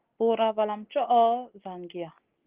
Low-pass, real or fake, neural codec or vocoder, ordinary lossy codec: 3.6 kHz; real; none; Opus, 16 kbps